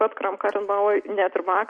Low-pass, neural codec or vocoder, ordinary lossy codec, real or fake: 9.9 kHz; none; MP3, 48 kbps; real